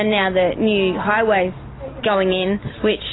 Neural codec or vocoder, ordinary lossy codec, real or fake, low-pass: none; AAC, 16 kbps; real; 7.2 kHz